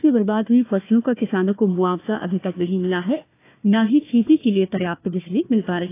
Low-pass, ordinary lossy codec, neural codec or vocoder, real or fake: 3.6 kHz; AAC, 24 kbps; codec, 16 kHz, 1 kbps, FunCodec, trained on Chinese and English, 50 frames a second; fake